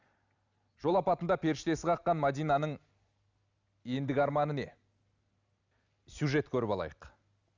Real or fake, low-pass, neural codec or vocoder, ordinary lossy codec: real; 7.2 kHz; none; none